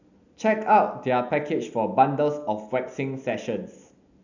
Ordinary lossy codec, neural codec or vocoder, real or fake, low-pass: none; none; real; 7.2 kHz